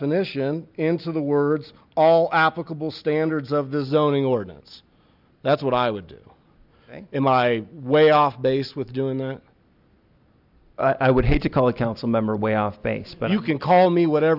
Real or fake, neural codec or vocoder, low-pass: real; none; 5.4 kHz